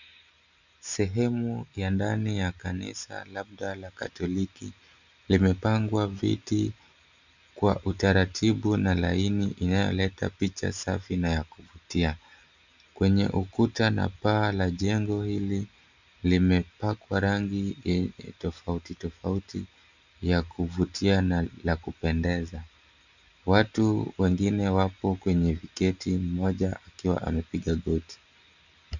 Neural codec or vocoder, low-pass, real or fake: none; 7.2 kHz; real